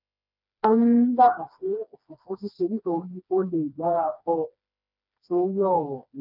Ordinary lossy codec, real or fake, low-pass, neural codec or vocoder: none; fake; 5.4 kHz; codec, 16 kHz, 2 kbps, FreqCodec, smaller model